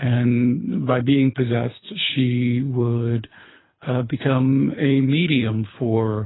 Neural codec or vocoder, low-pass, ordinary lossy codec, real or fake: codec, 24 kHz, 3 kbps, HILCodec; 7.2 kHz; AAC, 16 kbps; fake